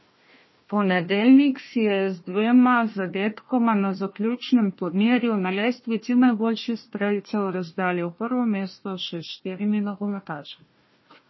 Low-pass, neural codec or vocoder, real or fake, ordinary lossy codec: 7.2 kHz; codec, 16 kHz, 1 kbps, FunCodec, trained on Chinese and English, 50 frames a second; fake; MP3, 24 kbps